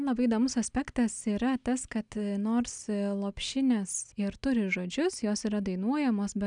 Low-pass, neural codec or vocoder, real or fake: 9.9 kHz; none; real